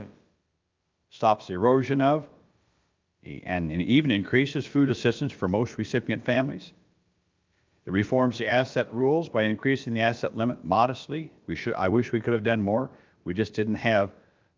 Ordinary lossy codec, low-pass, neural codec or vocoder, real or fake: Opus, 24 kbps; 7.2 kHz; codec, 16 kHz, about 1 kbps, DyCAST, with the encoder's durations; fake